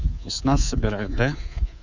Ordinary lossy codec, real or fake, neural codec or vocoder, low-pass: Opus, 64 kbps; fake; codec, 24 kHz, 3.1 kbps, DualCodec; 7.2 kHz